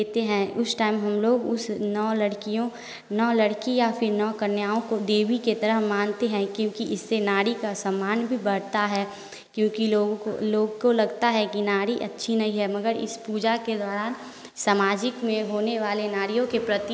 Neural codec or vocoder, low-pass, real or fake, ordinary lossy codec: none; none; real; none